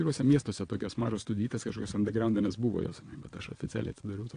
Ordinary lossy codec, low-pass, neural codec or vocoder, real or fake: AAC, 48 kbps; 9.9 kHz; vocoder, 22.05 kHz, 80 mel bands, WaveNeXt; fake